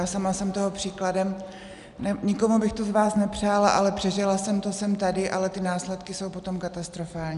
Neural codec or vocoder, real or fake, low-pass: none; real; 10.8 kHz